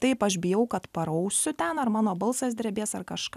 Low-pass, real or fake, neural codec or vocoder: 14.4 kHz; real; none